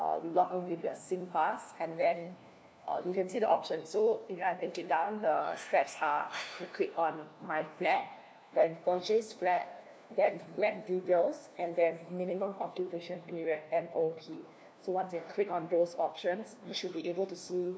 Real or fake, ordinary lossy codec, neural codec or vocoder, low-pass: fake; none; codec, 16 kHz, 1 kbps, FunCodec, trained on LibriTTS, 50 frames a second; none